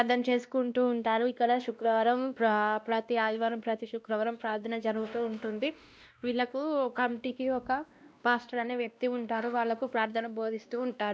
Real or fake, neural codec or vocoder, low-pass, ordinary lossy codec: fake; codec, 16 kHz, 1 kbps, X-Codec, WavLM features, trained on Multilingual LibriSpeech; none; none